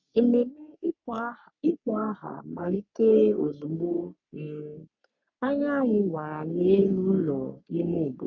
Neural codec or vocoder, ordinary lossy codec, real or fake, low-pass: codec, 44.1 kHz, 3.4 kbps, Pupu-Codec; none; fake; 7.2 kHz